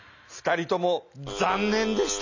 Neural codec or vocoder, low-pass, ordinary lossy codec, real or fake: none; 7.2 kHz; none; real